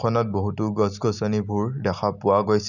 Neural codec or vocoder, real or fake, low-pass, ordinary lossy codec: none; real; 7.2 kHz; none